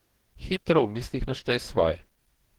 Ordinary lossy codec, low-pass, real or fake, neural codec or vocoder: Opus, 16 kbps; 19.8 kHz; fake; codec, 44.1 kHz, 2.6 kbps, DAC